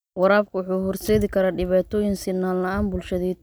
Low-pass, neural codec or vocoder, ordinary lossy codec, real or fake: none; none; none; real